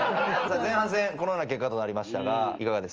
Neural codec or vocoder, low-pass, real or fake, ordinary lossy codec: none; 7.2 kHz; real; Opus, 24 kbps